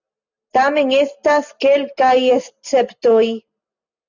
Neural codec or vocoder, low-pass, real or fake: none; 7.2 kHz; real